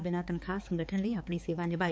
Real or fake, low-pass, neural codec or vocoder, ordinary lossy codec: fake; none; codec, 16 kHz, 4 kbps, X-Codec, HuBERT features, trained on balanced general audio; none